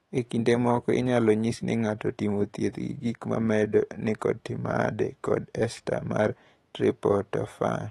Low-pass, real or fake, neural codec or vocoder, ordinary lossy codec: 19.8 kHz; fake; autoencoder, 48 kHz, 128 numbers a frame, DAC-VAE, trained on Japanese speech; AAC, 32 kbps